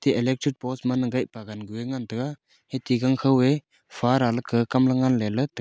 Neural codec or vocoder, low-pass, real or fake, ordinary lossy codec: none; none; real; none